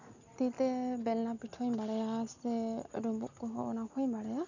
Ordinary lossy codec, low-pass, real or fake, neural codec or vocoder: none; 7.2 kHz; real; none